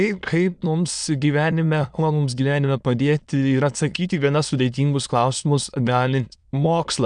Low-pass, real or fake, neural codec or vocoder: 9.9 kHz; fake; autoencoder, 22.05 kHz, a latent of 192 numbers a frame, VITS, trained on many speakers